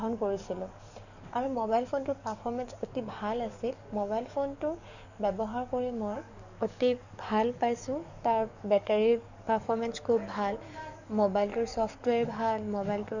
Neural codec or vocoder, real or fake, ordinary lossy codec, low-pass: codec, 44.1 kHz, 7.8 kbps, DAC; fake; none; 7.2 kHz